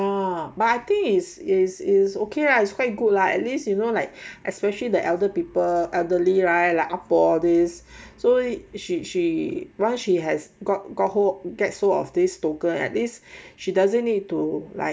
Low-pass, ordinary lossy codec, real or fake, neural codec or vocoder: none; none; real; none